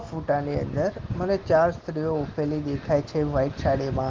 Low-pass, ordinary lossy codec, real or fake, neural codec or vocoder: 7.2 kHz; Opus, 16 kbps; real; none